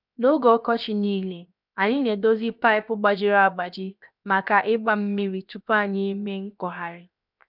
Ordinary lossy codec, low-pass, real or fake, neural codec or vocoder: none; 5.4 kHz; fake; codec, 16 kHz, 0.7 kbps, FocalCodec